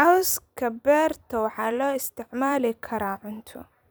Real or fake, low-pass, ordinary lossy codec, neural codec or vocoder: fake; none; none; vocoder, 44.1 kHz, 128 mel bands every 256 samples, BigVGAN v2